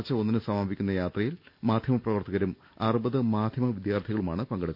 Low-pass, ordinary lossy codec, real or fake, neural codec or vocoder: 5.4 kHz; none; real; none